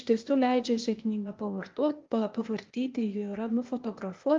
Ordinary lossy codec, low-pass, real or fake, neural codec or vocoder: Opus, 32 kbps; 7.2 kHz; fake; codec, 16 kHz, 0.8 kbps, ZipCodec